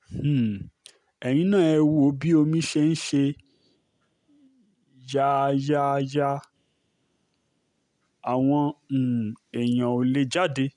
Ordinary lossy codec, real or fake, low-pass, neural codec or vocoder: none; real; 10.8 kHz; none